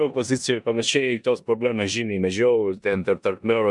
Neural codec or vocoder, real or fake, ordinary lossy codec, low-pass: codec, 16 kHz in and 24 kHz out, 0.9 kbps, LongCat-Audio-Codec, four codebook decoder; fake; MP3, 96 kbps; 10.8 kHz